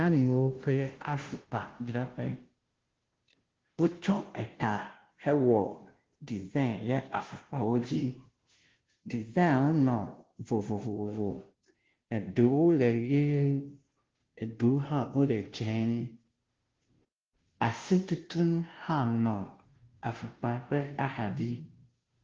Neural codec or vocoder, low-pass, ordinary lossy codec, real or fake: codec, 16 kHz, 0.5 kbps, FunCodec, trained on Chinese and English, 25 frames a second; 7.2 kHz; Opus, 16 kbps; fake